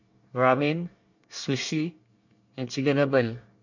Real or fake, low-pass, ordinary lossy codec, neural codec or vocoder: fake; 7.2 kHz; none; codec, 24 kHz, 1 kbps, SNAC